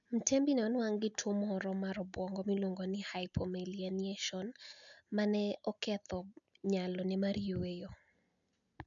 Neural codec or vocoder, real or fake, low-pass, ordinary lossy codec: none; real; 7.2 kHz; none